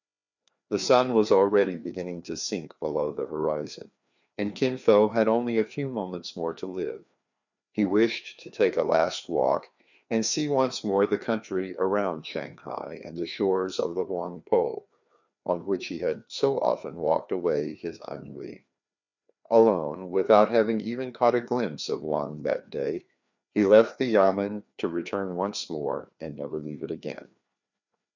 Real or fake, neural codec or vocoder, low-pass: fake; codec, 16 kHz, 2 kbps, FreqCodec, larger model; 7.2 kHz